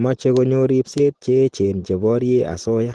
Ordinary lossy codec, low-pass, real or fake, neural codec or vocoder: Opus, 16 kbps; 10.8 kHz; real; none